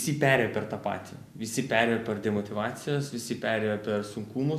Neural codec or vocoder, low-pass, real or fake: none; 14.4 kHz; real